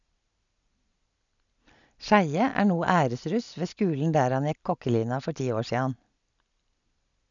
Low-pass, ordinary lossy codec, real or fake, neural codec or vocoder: 7.2 kHz; none; real; none